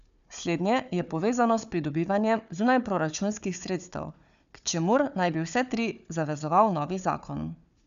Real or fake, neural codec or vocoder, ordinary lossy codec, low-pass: fake; codec, 16 kHz, 4 kbps, FunCodec, trained on Chinese and English, 50 frames a second; none; 7.2 kHz